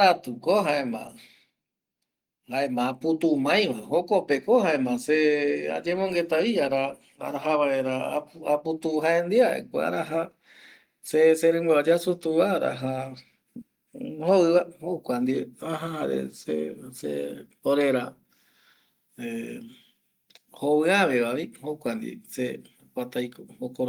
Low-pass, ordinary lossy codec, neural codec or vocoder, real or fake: 19.8 kHz; Opus, 24 kbps; none; real